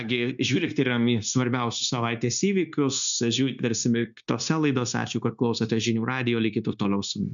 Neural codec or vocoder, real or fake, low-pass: codec, 16 kHz, 0.9 kbps, LongCat-Audio-Codec; fake; 7.2 kHz